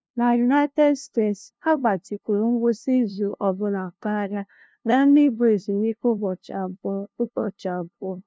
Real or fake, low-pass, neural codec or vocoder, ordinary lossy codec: fake; none; codec, 16 kHz, 0.5 kbps, FunCodec, trained on LibriTTS, 25 frames a second; none